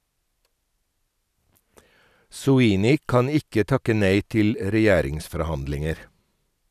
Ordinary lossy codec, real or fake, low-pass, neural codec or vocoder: none; real; 14.4 kHz; none